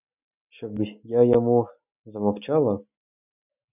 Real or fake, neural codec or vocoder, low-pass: real; none; 3.6 kHz